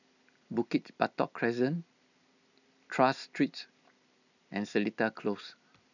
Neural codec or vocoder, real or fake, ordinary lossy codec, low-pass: none; real; none; 7.2 kHz